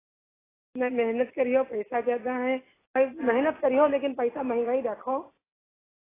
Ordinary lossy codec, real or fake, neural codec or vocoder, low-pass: AAC, 16 kbps; real; none; 3.6 kHz